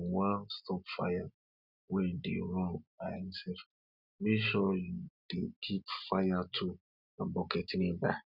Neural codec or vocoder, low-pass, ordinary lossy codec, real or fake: none; 5.4 kHz; none; real